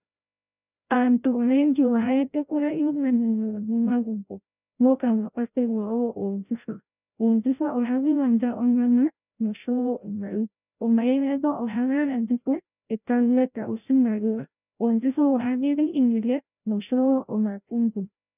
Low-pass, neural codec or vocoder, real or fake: 3.6 kHz; codec, 16 kHz, 0.5 kbps, FreqCodec, larger model; fake